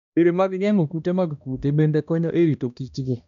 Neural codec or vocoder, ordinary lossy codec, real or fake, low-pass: codec, 16 kHz, 1 kbps, X-Codec, HuBERT features, trained on balanced general audio; none; fake; 7.2 kHz